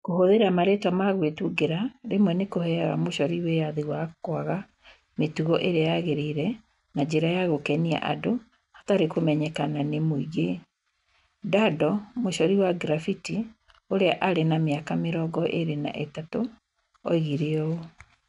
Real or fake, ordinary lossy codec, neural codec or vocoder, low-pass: real; none; none; 10.8 kHz